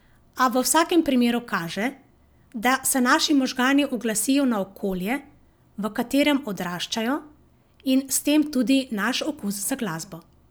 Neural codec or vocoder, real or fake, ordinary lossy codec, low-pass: none; real; none; none